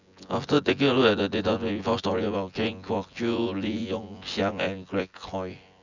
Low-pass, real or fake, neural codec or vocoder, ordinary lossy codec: 7.2 kHz; fake; vocoder, 24 kHz, 100 mel bands, Vocos; none